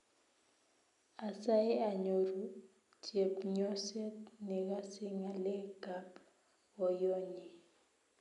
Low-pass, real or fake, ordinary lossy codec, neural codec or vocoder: 10.8 kHz; real; none; none